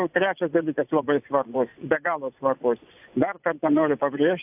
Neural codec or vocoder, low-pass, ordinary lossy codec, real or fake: vocoder, 24 kHz, 100 mel bands, Vocos; 3.6 kHz; AAC, 32 kbps; fake